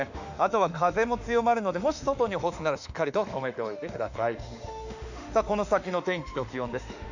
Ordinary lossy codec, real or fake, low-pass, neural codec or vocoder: none; fake; 7.2 kHz; autoencoder, 48 kHz, 32 numbers a frame, DAC-VAE, trained on Japanese speech